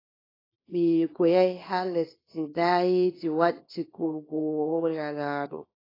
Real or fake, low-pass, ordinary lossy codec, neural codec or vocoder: fake; 5.4 kHz; AAC, 24 kbps; codec, 24 kHz, 0.9 kbps, WavTokenizer, small release